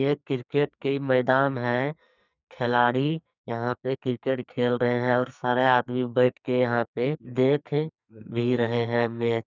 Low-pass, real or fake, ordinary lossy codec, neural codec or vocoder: 7.2 kHz; fake; none; codec, 44.1 kHz, 2.6 kbps, SNAC